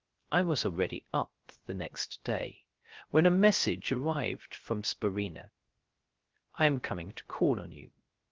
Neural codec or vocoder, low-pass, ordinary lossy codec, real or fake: codec, 16 kHz, 0.3 kbps, FocalCodec; 7.2 kHz; Opus, 16 kbps; fake